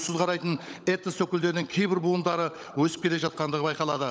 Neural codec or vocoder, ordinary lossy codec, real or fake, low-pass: codec, 16 kHz, 16 kbps, FunCodec, trained on LibriTTS, 50 frames a second; none; fake; none